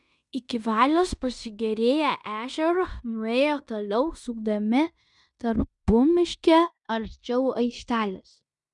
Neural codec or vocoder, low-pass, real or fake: codec, 16 kHz in and 24 kHz out, 0.9 kbps, LongCat-Audio-Codec, fine tuned four codebook decoder; 10.8 kHz; fake